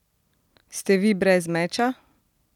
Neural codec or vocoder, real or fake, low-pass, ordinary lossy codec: none; real; 19.8 kHz; none